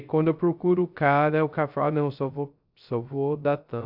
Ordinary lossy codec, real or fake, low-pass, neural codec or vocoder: AAC, 48 kbps; fake; 5.4 kHz; codec, 16 kHz, 0.3 kbps, FocalCodec